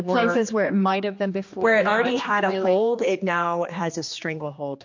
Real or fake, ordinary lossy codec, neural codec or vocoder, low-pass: fake; MP3, 48 kbps; codec, 16 kHz, 2 kbps, X-Codec, HuBERT features, trained on general audio; 7.2 kHz